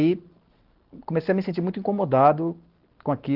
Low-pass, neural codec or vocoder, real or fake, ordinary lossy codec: 5.4 kHz; none; real; Opus, 16 kbps